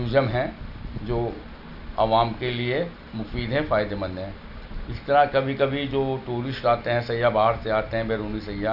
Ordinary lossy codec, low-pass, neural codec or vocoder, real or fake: none; 5.4 kHz; none; real